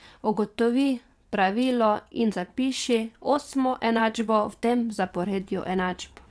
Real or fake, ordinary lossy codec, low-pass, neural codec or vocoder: fake; none; none; vocoder, 22.05 kHz, 80 mel bands, WaveNeXt